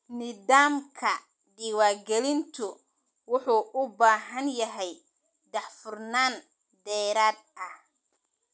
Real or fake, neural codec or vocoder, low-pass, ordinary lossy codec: real; none; none; none